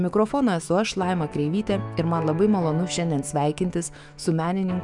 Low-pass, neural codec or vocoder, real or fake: 10.8 kHz; autoencoder, 48 kHz, 128 numbers a frame, DAC-VAE, trained on Japanese speech; fake